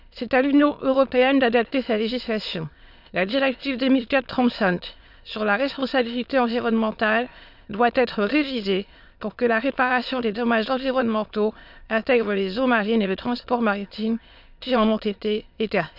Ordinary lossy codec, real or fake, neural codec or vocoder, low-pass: none; fake; autoencoder, 22.05 kHz, a latent of 192 numbers a frame, VITS, trained on many speakers; 5.4 kHz